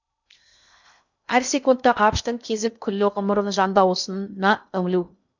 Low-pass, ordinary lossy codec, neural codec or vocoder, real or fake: 7.2 kHz; none; codec, 16 kHz in and 24 kHz out, 0.6 kbps, FocalCodec, streaming, 2048 codes; fake